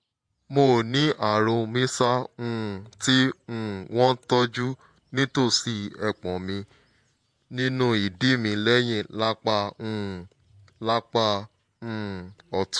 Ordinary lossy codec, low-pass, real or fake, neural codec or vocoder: MP3, 64 kbps; 9.9 kHz; fake; vocoder, 48 kHz, 128 mel bands, Vocos